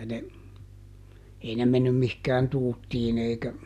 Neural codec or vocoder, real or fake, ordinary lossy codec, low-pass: none; real; none; 14.4 kHz